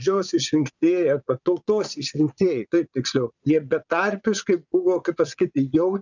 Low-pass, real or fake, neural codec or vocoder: 7.2 kHz; fake; vocoder, 22.05 kHz, 80 mel bands, Vocos